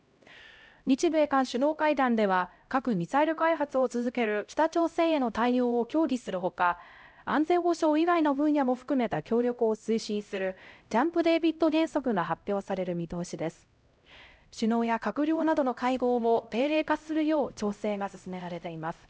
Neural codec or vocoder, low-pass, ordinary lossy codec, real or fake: codec, 16 kHz, 0.5 kbps, X-Codec, HuBERT features, trained on LibriSpeech; none; none; fake